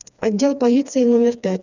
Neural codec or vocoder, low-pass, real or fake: codec, 16 kHz, 2 kbps, FreqCodec, smaller model; 7.2 kHz; fake